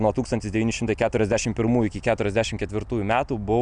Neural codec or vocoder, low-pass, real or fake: none; 9.9 kHz; real